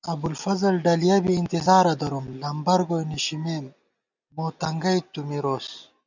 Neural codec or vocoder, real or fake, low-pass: none; real; 7.2 kHz